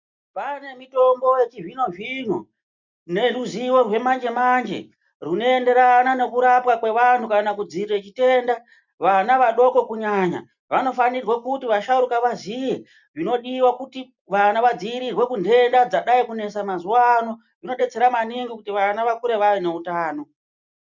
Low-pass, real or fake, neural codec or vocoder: 7.2 kHz; real; none